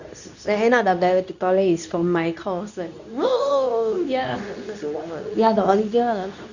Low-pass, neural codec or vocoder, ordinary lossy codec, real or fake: 7.2 kHz; codec, 24 kHz, 0.9 kbps, WavTokenizer, medium speech release version 2; none; fake